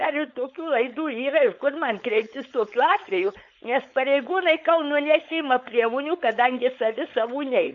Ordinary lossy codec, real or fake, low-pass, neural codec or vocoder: MP3, 96 kbps; fake; 7.2 kHz; codec, 16 kHz, 4.8 kbps, FACodec